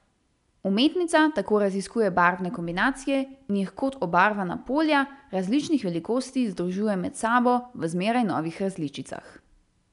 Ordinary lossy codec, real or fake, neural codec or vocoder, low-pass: none; real; none; 10.8 kHz